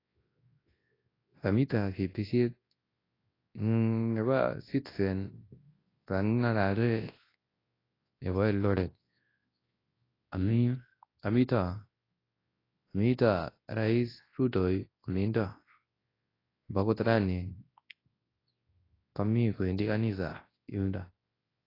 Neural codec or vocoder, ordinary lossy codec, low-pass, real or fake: codec, 24 kHz, 0.9 kbps, WavTokenizer, large speech release; AAC, 24 kbps; 5.4 kHz; fake